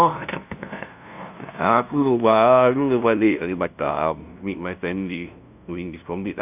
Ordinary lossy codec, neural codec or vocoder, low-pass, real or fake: none; codec, 16 kHz, 0.5 kbps, FunCodec, trained on LibriTTS, 25 frames a second; 3.6 kHz; fake